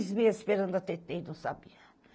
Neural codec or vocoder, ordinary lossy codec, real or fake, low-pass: none; none; real; none